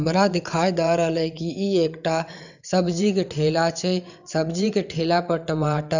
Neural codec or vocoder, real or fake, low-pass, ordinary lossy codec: vocoder, 22.05 kHz, 80 mel bands, WaveNeXt; fake; 7.2 kHz; none